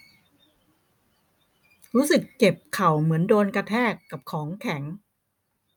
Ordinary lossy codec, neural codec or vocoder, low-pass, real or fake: none; none; none; real